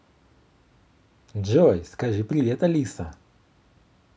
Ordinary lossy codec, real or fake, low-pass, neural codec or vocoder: none; real; none; none